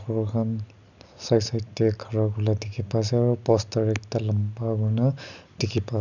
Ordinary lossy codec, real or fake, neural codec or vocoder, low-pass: none; real; none; 7.2 kHz